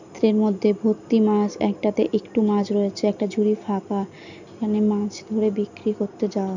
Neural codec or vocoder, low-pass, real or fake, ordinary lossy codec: none; 7.2 kHz; real; none